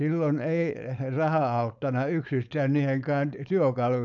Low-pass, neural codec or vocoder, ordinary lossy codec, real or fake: 7.2 kHz; codec, 16 kHz, 4.8 kbps, FACodec; none; fake